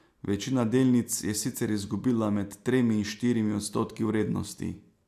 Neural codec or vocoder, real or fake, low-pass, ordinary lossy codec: none; real; 14.4 kHz; AAC, 96 kbps